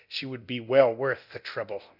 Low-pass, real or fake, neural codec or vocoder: 5.4 kHz; fake; codec, 24 kHz, 0.9 kbps, DualCodec